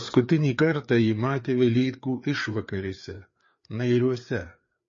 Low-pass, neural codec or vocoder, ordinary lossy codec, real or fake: 7.2 kHz; codec, 16 kHz, 4 kbps, FreqCodec, larger model; MP3, 32 kbps; fake